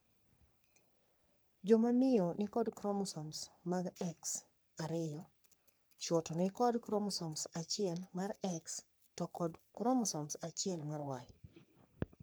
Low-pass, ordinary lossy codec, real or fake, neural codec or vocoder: none; none; fake; codec, 44.1 kHz, 3.4 kbps, Pupu-Codec